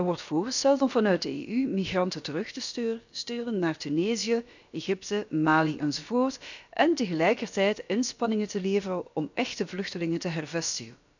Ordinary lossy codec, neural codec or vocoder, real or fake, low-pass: none; codec, 16 kHz, about 1 kbps, DyCAST, with the encoder's durations; fake; 7.2 kHz